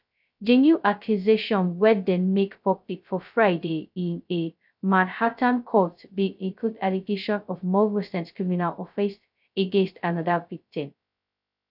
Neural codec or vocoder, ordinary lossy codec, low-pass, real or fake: codec, 16 kHz, 0.2 kbps, FocalCodec; none; 5.4 kHz; fake